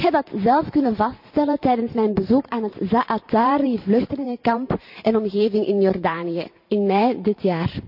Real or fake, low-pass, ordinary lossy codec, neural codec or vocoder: fake; 5.4 kHz; none; vocoder, 44.1 kHz, 128 mel bands every 512 samples, BigVGAN v2